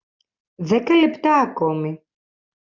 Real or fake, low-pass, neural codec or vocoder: real; 7.2 kHz; none